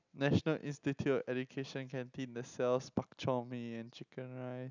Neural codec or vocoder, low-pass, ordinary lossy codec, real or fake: none; 7.2 kHz; none; real